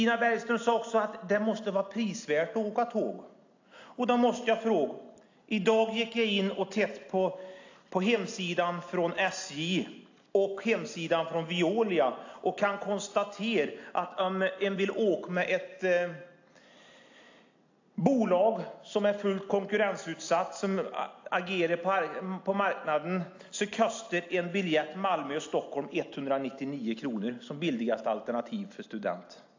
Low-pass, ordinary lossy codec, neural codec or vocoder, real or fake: 7.2 kHz; AAC, 48 kbps; none; real